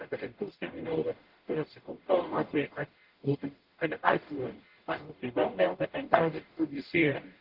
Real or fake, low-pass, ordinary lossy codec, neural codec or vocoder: fake; 5.4 kHz; Opus, 16 kbps; codec, 44.1 kHz, 0.9 kbps, DAC